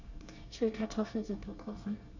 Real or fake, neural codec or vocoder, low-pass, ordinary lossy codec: fake; codec, 24 kHz, 1 kbps, SNAC; 7.2 kHz; none